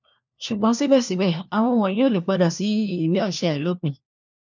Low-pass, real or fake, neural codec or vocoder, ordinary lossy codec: 7.2 kHz; fake; codec, 16 kHz, 1 kbps, FunCodec, trained on LibriTTS, 50 frames a second; none